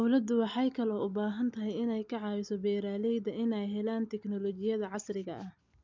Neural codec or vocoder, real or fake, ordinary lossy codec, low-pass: none; real; MP3, 64 kbps; 7.2 kHz